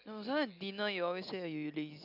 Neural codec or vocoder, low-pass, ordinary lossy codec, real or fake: none; 5.4 kHz; none; real